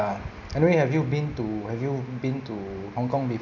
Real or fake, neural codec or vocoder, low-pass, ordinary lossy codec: real; none; 7.2 kHz; none